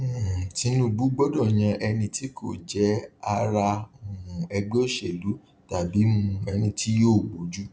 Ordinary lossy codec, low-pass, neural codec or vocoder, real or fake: none; none; none; real